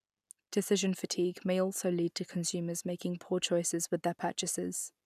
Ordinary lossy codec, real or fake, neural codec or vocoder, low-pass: none; real; none; 14.4 kHz